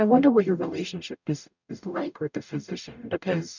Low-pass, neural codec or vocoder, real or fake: 7.2 kHz; codec, 44.1 kHz, 0.9 kbps, DAC; fake